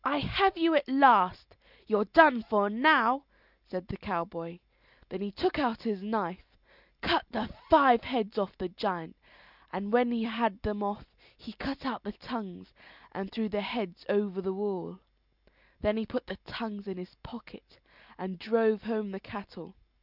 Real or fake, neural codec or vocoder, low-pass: real; none; 5.4 kHz